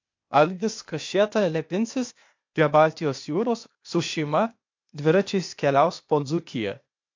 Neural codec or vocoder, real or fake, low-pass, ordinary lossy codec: codec, 16 kHz, 0.8 kbps, ZipCodec; fake; 7.2 kHz; MP3, 48 kbps